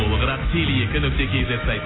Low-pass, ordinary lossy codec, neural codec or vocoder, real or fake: 7.2 kHz; AAC, 16 kbps; none; real